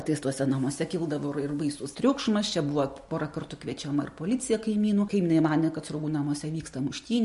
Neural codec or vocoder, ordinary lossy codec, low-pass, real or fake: none; MP3, 48 kbps; 14.4 kHz; real